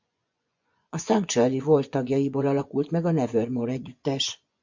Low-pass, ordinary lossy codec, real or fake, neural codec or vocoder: 7.2 kHz; MP3, 64 kbps; fake; vocoder, 24 kHz, 100 mel bands, Vocos